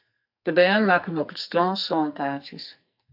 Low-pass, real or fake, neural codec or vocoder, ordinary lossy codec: 5.4 kHz; fake; codec, 44.1 kHz, 2.6 kbps, SNAC; AAC, 48 kbps